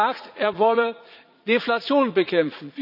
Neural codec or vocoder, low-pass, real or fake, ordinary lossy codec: vocoder, 44.1 kHz, 80 mel bands, Vocos; 5.4 kHz; fake; none